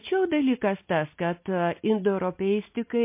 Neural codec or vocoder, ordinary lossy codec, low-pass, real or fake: none; MP3, 24 kbps; 3.6 kHz; real